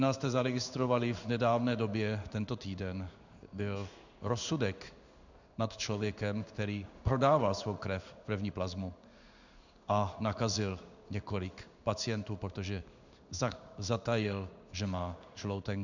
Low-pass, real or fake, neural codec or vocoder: 7.2 kHz; fake; codec, 16 kHz in and 24 kHz out, 1 kbps, XY-Tokenizer